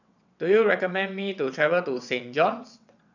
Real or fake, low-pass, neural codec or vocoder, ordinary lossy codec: fake; 7.2 kHz; vocoder, 22.05 kHz, 80 mel bands, WaveNeXt; none